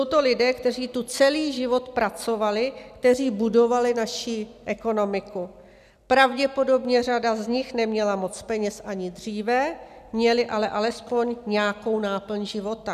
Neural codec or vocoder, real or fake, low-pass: none; real; 14.4 kHz